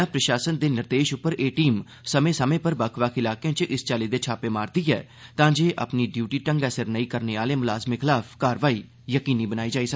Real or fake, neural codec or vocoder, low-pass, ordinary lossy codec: real; none; none; none